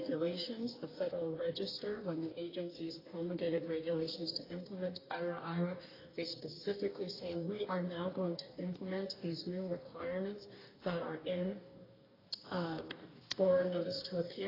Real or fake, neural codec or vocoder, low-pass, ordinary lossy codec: fake; codec, 44.1 kHz, 2.6 kbps, DAC; 5.4 kHz; AAC, 24 kbps